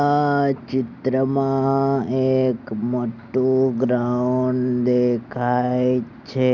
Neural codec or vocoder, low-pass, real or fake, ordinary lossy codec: none; 7.2 kHz; real; none